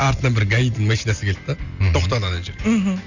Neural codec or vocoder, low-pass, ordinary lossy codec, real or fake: none; 7.2 kHz; none; real